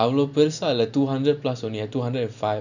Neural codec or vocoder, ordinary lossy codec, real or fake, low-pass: none; none; real; 7.2 kHz